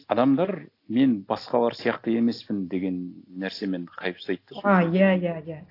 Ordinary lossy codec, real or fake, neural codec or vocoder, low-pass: AAC, 32 kbps; real; none; 5.4 kHz